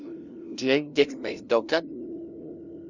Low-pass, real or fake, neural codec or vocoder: 7.2 kHz; fake; codec, 16 kHz, 0.5 kbps, FunCodec, trained on LibriTTS, 25 frames a second